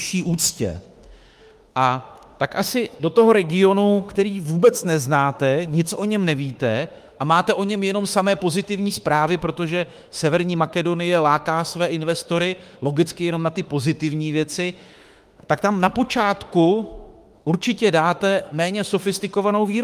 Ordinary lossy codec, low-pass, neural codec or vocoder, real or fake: Opus, 32 kbps; 14.4 kHz; autoencoder, 48 kHz, 32 numbers a frame, DAC-VAE, trained on Japanese speech; fake